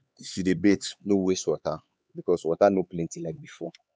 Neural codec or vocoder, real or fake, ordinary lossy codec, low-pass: codec, 16 kHz, 4 kbps, X-Codec, HuBERT features, trained on LibriSpeech; fake; none; none